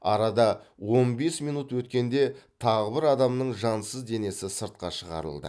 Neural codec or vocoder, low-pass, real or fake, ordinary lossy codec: none; none; real; none